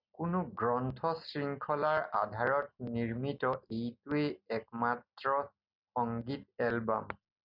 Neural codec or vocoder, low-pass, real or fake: none; 5.4 kHz; real